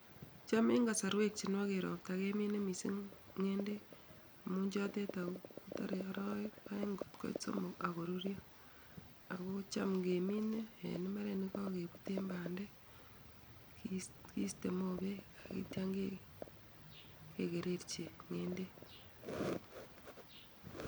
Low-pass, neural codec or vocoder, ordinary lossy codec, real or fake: none; none; none; real